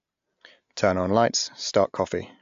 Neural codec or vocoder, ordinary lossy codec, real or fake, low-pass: none; AAC, 64 kbps; real; 7.2 kHz